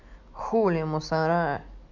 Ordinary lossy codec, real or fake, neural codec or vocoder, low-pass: none; real; none; 7.2 kHz